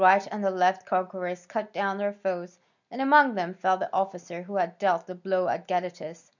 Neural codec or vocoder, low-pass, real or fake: none; 7.2 kHz; real